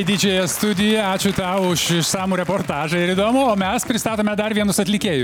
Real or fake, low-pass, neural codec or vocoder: real; 19.8 kHz; none